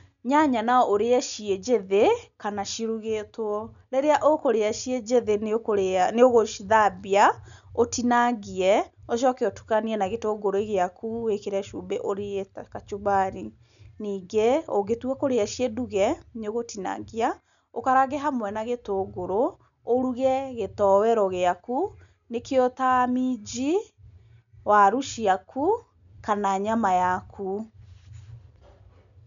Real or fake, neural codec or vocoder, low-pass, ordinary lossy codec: real; none; 7.2 kHz; none